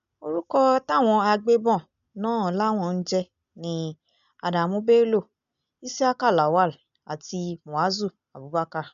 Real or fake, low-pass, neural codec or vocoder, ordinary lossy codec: real; 7.2 kHz; none; none